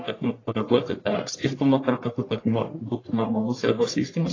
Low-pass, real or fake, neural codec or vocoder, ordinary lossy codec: 7.2 kHz; fake; codec, 44.1 kHz, 1.7 kbps, Pupu-Codec; AAC, 32 kbps